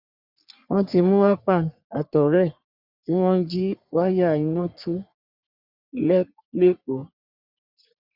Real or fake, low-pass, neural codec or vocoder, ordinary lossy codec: fake; 5.4 kHz; codec, 44.1 kHz, 3.4 kbps, Pupu-Codec; Opus, 64 kbps